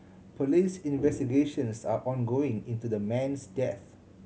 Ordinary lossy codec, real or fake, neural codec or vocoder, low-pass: none; real; none; none